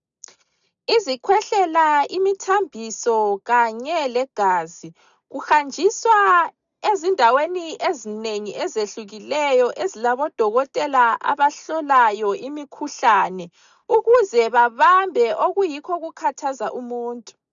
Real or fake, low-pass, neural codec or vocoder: real; 7.2 kHz; none